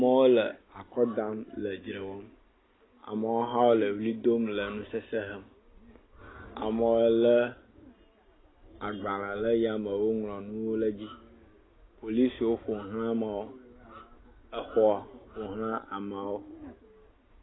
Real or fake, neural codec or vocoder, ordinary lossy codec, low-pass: real; none; AAC, 16 kbps; 7.2 kHz